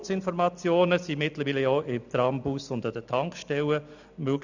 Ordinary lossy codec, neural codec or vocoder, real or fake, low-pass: none; none; real; 7.2 kHz